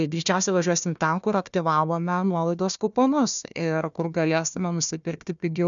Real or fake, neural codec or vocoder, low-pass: fake; codec, 16 kHz, 1 kbps, FunCodec, trained on Chinese and English, 50 frames a second; 7.2 kHz